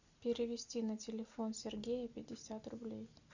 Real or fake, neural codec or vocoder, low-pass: real; none; 7.2 kHz